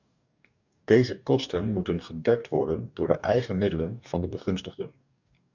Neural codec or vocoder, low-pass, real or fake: codec, 44.1 kHz, 2.6 kbps, DAC; 7.2 kHz; fake